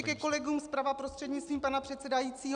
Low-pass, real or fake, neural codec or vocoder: 9.9 kHz; real; none